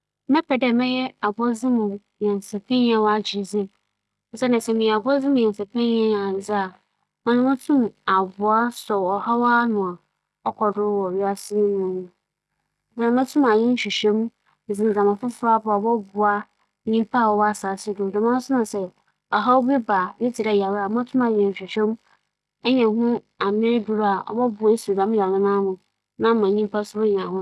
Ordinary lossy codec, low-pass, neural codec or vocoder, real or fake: none; none; none; real